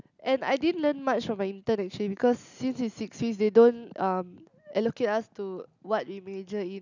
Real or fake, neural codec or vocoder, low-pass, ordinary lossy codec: real; none; 7.2 kHz; none